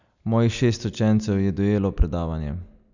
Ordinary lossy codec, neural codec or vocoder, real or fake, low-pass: none; none; real; 7.2 kHz